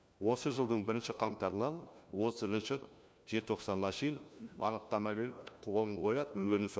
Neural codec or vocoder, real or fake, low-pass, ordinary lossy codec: codec, 16 kHz, 1 kbps, FunCodec, trained on LibriTTS, 50 frames a second; fake; none; none